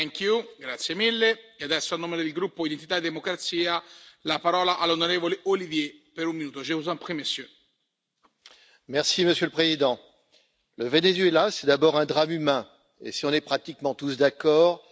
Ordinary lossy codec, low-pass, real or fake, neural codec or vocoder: none; none; real; none